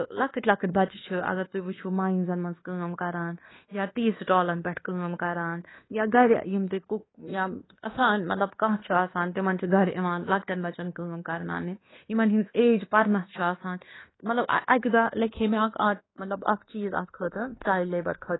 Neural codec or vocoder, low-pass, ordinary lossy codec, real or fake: codec, 24 kHz, 1.2 kbps, DualCodec; 7.2 kHz; AAC, 16 kbps; fake